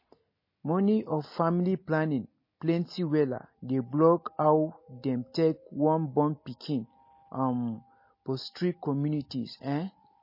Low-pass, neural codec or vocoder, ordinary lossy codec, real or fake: 5.4 kHz; none; MP3, 24 kbps; real